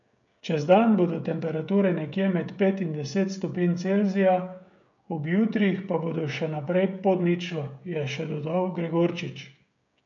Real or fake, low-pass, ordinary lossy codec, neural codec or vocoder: fake; 7.2 kHz; none; codec, 16 kHz, 16 kbps, FreqCodec, smaller model